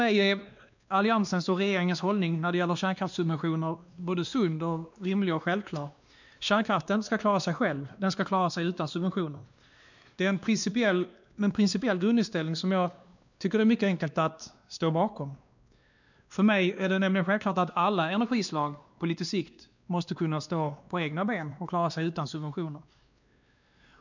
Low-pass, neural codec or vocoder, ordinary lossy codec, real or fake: 7.2 kHz; codec, 16 kHz, 2 kbps, X-Codec, WavLM features, trained on Multilingual LibriSpeech; none; fake